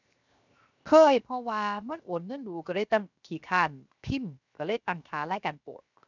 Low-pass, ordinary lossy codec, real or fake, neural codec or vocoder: 7.2 kHz; none; fake; codec, 16 kHz, 0.7 kbps, FocalCodec